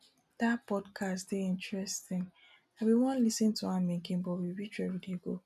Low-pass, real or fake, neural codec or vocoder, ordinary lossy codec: 14.4 kHz; real; none; none